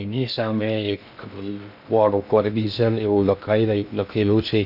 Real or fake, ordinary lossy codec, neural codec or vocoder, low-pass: fake; none; codec, 16 kHz in and 24 kHz out, 0.6 kbps, FocalCodec, streaming, 2048 codes; 5.4 kHz